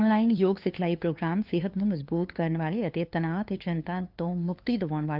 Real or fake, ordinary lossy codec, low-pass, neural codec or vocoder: fake; Opus, 24 kbps; 5.4 kHz; codec, 16 kHz, 2 kbps, FunCodec, trained on LibriTTS, 25 frames a second